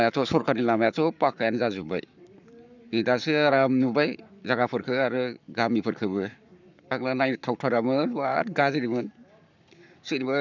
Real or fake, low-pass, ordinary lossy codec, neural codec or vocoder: fake; 7.2 kHz; none; codec, 44.1 kHz, 7.8 kbps, Pupu-Codec